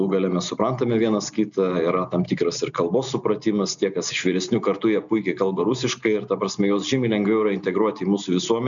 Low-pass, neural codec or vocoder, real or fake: 7.2 kHz; none; real